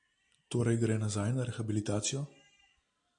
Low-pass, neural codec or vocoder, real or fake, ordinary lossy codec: 9.9 kHz; none; real; AAC, 64 kbps